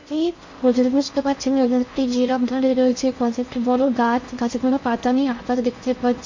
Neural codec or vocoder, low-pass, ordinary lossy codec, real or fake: codec, 16 kHz in and 24 kHz out, 0.6 kbps, FocalCodec, streaming, 4096 codes; 7.2 kHz; MP3, 48 kbps; fake